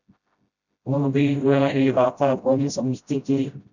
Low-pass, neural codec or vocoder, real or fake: 7.2 kHz; codec, 16 kHz, 0.5 kbps, FreqCodec, smaller model; fake